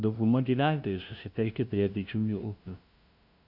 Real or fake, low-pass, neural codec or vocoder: fake; 5.4 kHz; codec, 16 kHz, 0.5 kbps, FunCodec, trained on LibriTTS, 25 frames a second